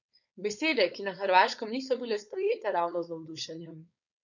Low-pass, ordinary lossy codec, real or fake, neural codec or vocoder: 7.2 kHz; none; fake; codec, 16 kHz, 4.8 kbps, FACodec